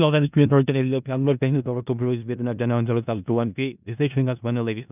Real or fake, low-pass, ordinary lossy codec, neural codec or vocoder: fake; 3.6 kHz; none; codec, 16 kHz in and 24 kHz out, 0.4 kbps, LongCat-Audio-Codec, four codebook decoder